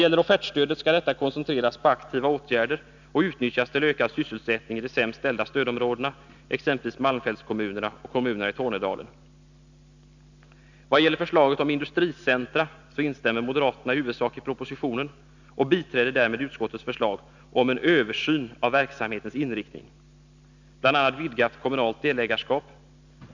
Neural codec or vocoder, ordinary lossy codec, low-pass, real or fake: none; none; 7.2 kHz; real